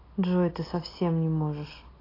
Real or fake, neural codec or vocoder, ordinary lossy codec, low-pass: real; none; AAC, 24 kbps; 5.4 kHz